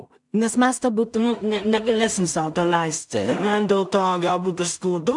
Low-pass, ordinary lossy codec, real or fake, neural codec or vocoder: 10.8 kHz; MP3, 96 kbps; fake; codec, 16 kHz in and 24 kHz out, 0.4 kbps, LongCat-Audio-Codec, two codebook decoder